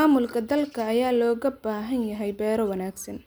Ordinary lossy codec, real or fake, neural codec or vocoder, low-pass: none; real; none; none